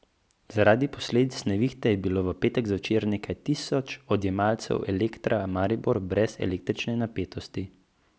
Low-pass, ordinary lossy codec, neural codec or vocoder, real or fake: none; none; none; real